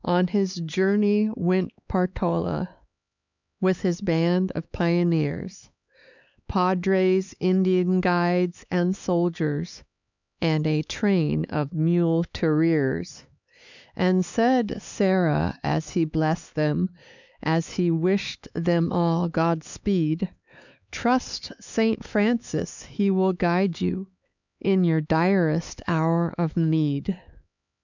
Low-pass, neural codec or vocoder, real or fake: 7.2 kHz; codec, 16 kHz, 4 kbps, X-Codec, HuBERT features, trained on LibriSpeech; fake